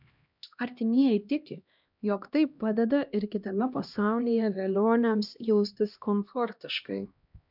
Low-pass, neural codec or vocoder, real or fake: 5.4 kHz; codec, 16 kHz, 1 kbps, X-Codec, HuBERT features, trained on LibriSpeech; fake